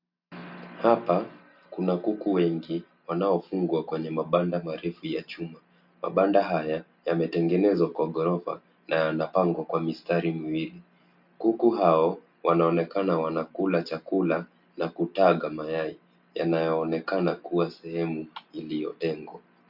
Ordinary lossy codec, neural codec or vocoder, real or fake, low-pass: MP3, 48 kbps; none; real; 5.4 kHz